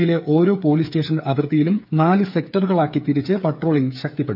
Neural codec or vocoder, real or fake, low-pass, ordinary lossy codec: codec, 16 kHz, 8 kbps, FreqCodec, smaller model; fake; 5.4 kHz; AAC, 48 kbps